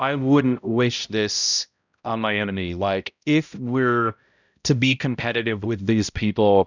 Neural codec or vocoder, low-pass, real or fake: codec, 16 kHz, 0.5 kbps, X-Codec, HuBERT features, trained on balanced general audio; 7.2 kHz; fake